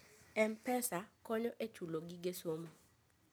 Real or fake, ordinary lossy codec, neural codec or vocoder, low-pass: real; none; none; none